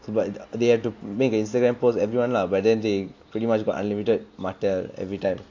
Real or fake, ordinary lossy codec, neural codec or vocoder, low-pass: real; AAC, 48 kbps; none; 7.2 kHz